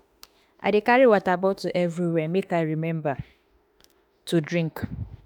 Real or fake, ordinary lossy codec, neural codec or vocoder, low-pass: fake; none; autoencoder, 48 kHz, 32 numbers a frame, DAC-VAE, trained on Japanese speech; none